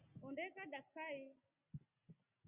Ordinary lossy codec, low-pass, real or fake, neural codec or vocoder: Opus, 64 kbps; 3.6 kHz; real; none